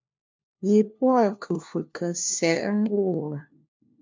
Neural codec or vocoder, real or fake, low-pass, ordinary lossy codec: codec, 16 kHz, 1 kbps, FunCodec, trained on LibriTTS, 50 frames a second; fake; 7.2 kHz; MP3, 64 kbps